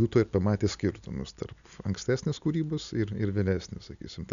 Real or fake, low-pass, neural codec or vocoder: real; 7.2 kHz; none